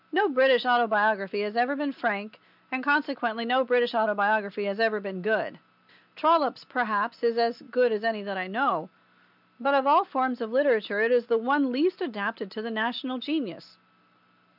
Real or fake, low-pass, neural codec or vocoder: real; 5.4 kHz; none